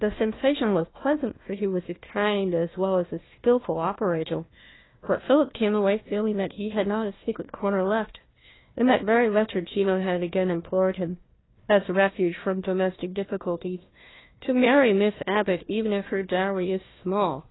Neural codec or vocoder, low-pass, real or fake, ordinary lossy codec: codec, 16 kHz, 1 kbps, FunCodec, trained on Chinese and English, 50 frames a second; 7.2 kHz; fake; AAC, 16 kbps